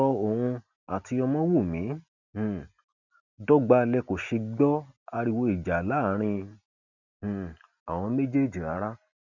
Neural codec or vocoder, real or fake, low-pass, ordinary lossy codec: none; real; 7.2 kHz; none